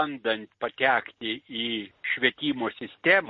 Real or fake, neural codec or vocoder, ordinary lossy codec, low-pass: real; none; MP3, 32 kbps; 7.2 kHz